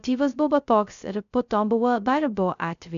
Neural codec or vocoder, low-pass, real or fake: codec, 16 kHz, 0.2 kbps, FocalCodec; 7.2 kHz; fake